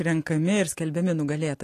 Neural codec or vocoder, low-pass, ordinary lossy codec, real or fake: none; 14.4 kHz; AAC, 48 kbps; real